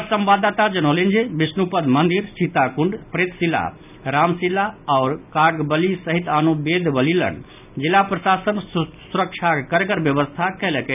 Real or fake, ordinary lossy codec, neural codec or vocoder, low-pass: real; none; none; 3.6 kHz